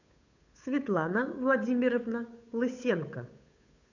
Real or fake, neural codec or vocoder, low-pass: fake; codec, 16 kHz, 8 kbps, FunCodec, trained on Chinese and English, 25 frames a second; 7.2 kHz